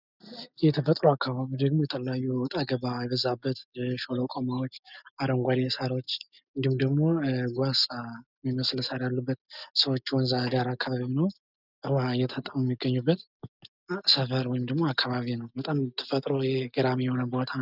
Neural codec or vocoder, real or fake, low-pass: none; real; 5.4 kHz